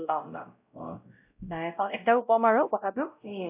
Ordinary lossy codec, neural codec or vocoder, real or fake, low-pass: AAC, 32 kbps; codec, 16 kHz, 0.5 kbps, X-Codec, WavLM features, trained on Multilingual LibriSpeech; fake; 3.6 kHz